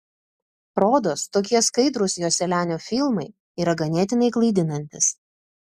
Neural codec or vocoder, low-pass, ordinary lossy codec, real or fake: none; 14.4 kHz; Opus, 64 kbps; real